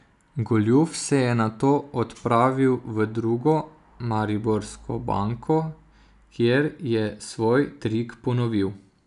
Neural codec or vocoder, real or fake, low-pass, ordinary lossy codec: none; real; 10.8 kHz; none